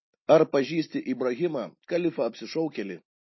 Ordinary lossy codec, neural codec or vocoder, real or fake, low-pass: MP3, 24 kbps; none; real; 7.2 kHz